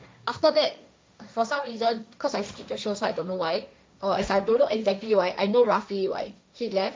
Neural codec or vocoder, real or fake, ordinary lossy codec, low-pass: codec, 16 kHz, 1.1 kbps, Voila-Tokenizer; fake; none; none